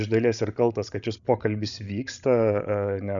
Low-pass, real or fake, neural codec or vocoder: 7.2 kHz; fake; codec, 16 kHz, 16 kbps, FreqCodec, larger model